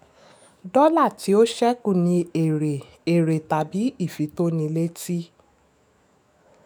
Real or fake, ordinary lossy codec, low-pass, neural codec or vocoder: fake; none; none; autoencoder, 48 kHz, 128 numbers a frame, DAC-VAE, trained on Japanese speech